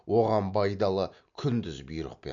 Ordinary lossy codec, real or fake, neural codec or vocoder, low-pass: MP3, 64 kbps; real; none; 7.2 kHz